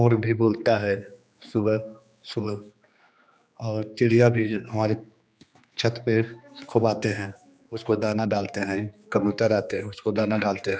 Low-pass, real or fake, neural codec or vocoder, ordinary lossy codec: none; fake; codec, 16 kHz, 2 kbps, X-Codec, HuBERT features, trained on general audio; none